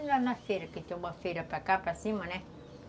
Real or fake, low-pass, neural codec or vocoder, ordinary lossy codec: real; none; none; none